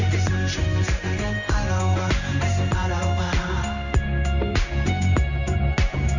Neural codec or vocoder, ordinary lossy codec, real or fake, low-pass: codec, 16 kHz in and 24 kHz out, 1 kbps, XY-Tokenizer; none; fake; 7.2 kHz